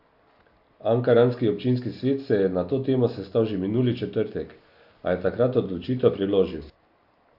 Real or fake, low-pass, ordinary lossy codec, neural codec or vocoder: real; 5.4 kHz; AAC, 48 kbps; none